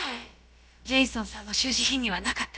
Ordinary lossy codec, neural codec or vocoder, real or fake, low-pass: none; codec, 16 kHz, about 1 kbps, DyCAST, with the encoder's durations; fake; none